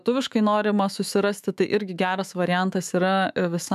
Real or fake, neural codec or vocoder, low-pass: fake; autoencoder, 48 kHz, 128 numbers a frame, DAC-VAE, trained on Japanese speech; 14.4 kHz